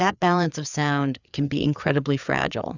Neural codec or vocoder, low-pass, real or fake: codec, 16 kHz, 4 kbps, FreqCodec, larger model; 7.2 kHz; fake